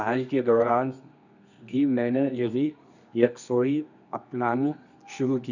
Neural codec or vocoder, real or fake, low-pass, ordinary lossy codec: codec, 24 kHz, 0.9 kbps, WavTokenizer, medium music audio release; fake; 7.2 kHz; none